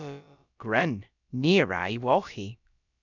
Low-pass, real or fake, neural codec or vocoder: 7.2 kHz; fake; codec, 16 kHz, about 1 kbps, DyCAST, with the encoder's durations